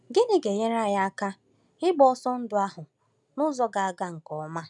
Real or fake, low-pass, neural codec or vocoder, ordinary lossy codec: real; 10.8 kHz; none; none